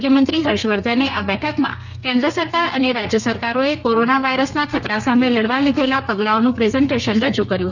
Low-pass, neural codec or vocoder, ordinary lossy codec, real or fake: 7.2 kHz; codec, 32 kHz, 1.9 kbps, SNAC; none; fake